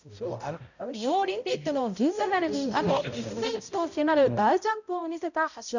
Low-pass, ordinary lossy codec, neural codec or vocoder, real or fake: 7.2 kHz; none; codec, 16 kHz, 0.5 kbps, X-Codec, HuBERT features, trained on balanced general audio; fake